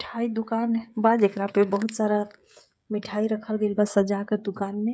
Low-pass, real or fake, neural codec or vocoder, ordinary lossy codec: none; fake; codec, 16 kHz, 16 kbps, FreqCodec, smaller model; none